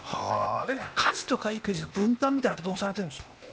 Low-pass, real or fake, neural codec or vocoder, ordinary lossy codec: none; fake; codec, 16 kHz, 0.8 kbps, ZipCodec; none